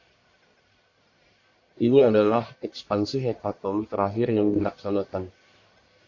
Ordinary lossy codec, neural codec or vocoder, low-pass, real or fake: AAC, 48 kbps; codec, 44.1 kHz, 1.7 kbps, Pupu-Codec; 7.2 kHz; fake